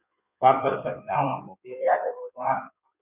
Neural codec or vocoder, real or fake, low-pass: codec, 16 kHz in and 24 kHz out, 1.1 kbps, FireRedTTS-2 codec; fake; 3.6 kHz